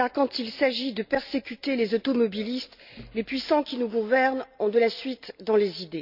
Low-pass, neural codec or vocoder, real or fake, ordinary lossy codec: 5.4 kHz; none; real; none